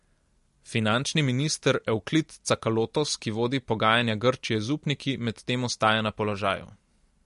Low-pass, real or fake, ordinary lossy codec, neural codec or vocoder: 14.4 kHz; real; MP3, 48 kbps; none